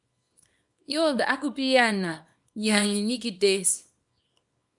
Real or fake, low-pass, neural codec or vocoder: fake; 10.8 kHz; codec, 24 kHz, 0.9 kbps, WavTokenizer, small release